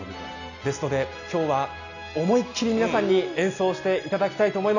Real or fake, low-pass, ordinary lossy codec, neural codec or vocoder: real; 7.2 kHz; none; none